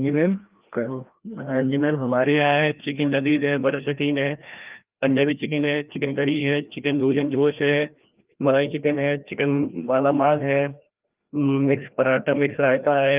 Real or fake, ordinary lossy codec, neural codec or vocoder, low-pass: fake; Opus, 32 kbps; codec, 16 kHz, 1 kbps, FreqCodec, larger model; 3.6 kHz